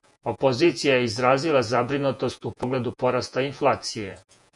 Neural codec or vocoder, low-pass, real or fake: vocoder, 48 kHz, 128 mel bands, Vocos; 10.8 kHz; fake